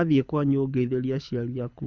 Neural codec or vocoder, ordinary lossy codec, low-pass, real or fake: codec, 24 kHz, 6 kbps, HILCodec; none; 7.2 kHz; fake